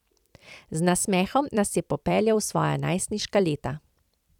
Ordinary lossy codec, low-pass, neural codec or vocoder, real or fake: none; 19.8 kHz; vocoder, 44.1 kHz, 128 mel bands every 256 samples, BigVGAN v2; fake